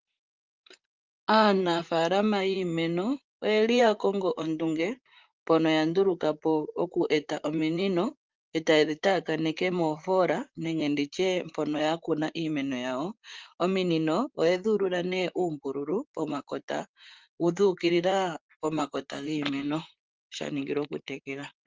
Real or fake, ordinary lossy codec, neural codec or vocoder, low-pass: fake; Opus, 24 kbps; vocoder, 44.1 kHz, 128 mel bands, Pupu-Vocoder; 7.2 kHz